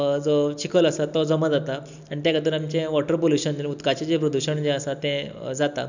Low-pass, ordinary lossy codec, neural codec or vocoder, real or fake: 7.2 kHz; none; none; real